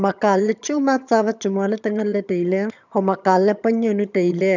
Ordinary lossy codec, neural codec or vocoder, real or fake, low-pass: none; vocoder, 22.05 kHz, 80 mel bands, HiFi-GAN; fake; 7.2 kHz